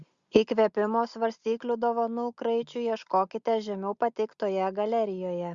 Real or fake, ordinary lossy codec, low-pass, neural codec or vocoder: real; Opus, 64 kbps; 7.2 kHz; none